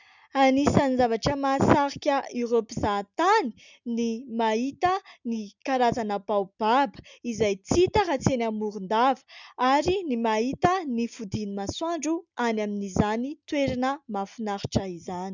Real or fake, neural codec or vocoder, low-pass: real; none; 7.2 kHz